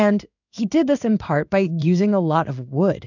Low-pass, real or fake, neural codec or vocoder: 7.2 kHz; fake; codec, 16 kHz in and 24 kHz out, 1 kbps, XY-Tokenizer